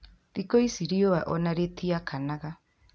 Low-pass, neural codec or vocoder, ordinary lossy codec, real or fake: none; none; none; real